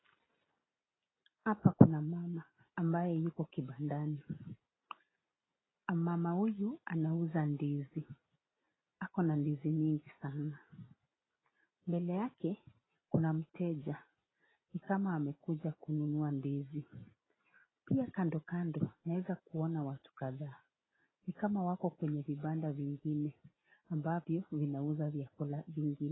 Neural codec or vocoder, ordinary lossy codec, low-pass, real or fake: none; AAC, 16 kbps; 7.2 kHz; real